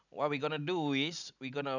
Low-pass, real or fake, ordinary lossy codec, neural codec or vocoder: 7.2 kHz; real; none; none